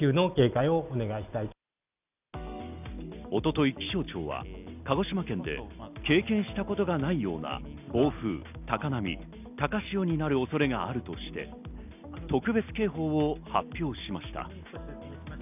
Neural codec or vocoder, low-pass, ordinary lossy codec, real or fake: none; 3.6 kHz; none; real